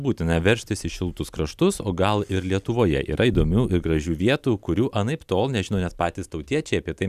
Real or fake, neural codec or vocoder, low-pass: real; none; 14.4 kHz